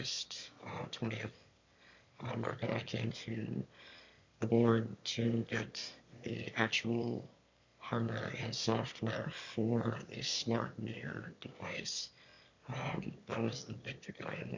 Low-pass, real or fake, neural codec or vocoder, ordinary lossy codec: 7.2 kHz; fake; autoencoder, 22.05 kHz, a latent of 192 numbers a frame, VITS, trained on one speaker; MP3, 48 kbps